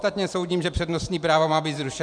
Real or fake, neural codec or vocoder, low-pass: fake; vocoder, 44.1 kHz, 128 mel bands every 512 samples, BigVGAN v2; 9.9 kHz